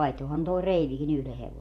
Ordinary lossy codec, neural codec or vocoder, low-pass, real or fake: none; vocoder, 48 kHz, 128 mel bands, Vocos; 14.4 kHz; fake